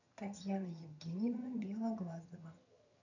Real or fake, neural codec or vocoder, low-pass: fake; vocoder, 22.05 kHz, 80 mel bands, HiFi-GAN; 7.2 kHz